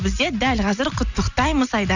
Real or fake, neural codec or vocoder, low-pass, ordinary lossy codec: real; none; 7.2 kHz; none